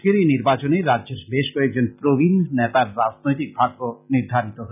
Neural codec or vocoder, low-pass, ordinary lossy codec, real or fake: none; 3.6 kHz; none; real